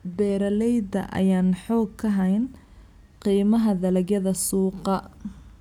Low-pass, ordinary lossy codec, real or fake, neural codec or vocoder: 19.8 kHz; none; real; none